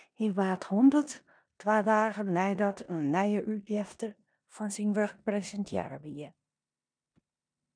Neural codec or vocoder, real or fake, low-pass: codec, 16 kHz in and 24 kHz out, 0.9 kbps, LongCat-Audio-Codec, four codebook decoder; fake; 9.9 kHz